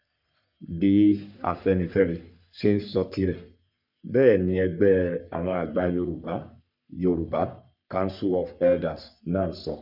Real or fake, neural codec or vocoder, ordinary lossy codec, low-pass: fake; codec, 44.1 kHz, 3.4 kbps, Pupu-Codec; none; 5.4 kHz